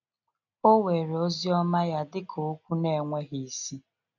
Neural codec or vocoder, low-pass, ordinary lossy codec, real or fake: none; 7.2 kHz; none; real